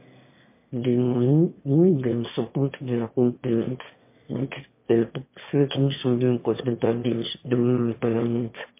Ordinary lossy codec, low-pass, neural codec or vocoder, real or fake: MP3, 24 kbps; 3.6 kHz; autoencoder, 22.05 kHz, a latent of 192 numbers a frame, VITS, trained on one speaker; fake